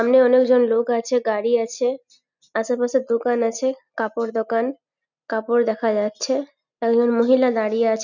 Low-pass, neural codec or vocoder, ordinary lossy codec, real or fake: 7.2 kHz; none; none; real